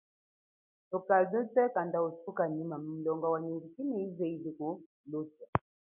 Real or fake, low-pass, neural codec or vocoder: real; 3.6 kHz; none